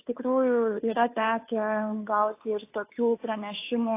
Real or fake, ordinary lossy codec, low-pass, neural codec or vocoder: fake; AAC, 24 kbps; 3.6 kHz; codec, 16 kHz, 2 kbps, FunCodec, trained on Chinese and English, 25 frames a second